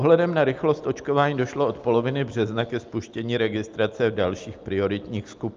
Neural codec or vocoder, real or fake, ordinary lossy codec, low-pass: none; real; Opus, 24 kbps; 7.2 kHz